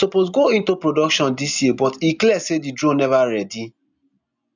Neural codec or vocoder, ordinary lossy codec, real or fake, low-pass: none; none; real; 7.2 kHz